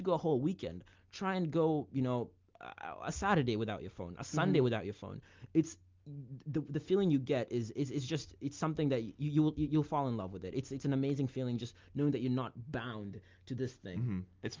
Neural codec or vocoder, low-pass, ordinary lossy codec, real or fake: none; 7.2 kHz; Opus, 32 kbps; real